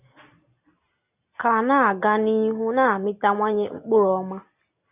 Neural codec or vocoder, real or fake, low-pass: none; real; 3.6 kHz